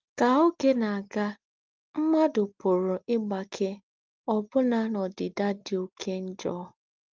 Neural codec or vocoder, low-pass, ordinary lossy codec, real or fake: none; 7.2 kHz; Opus, 32 kbps; real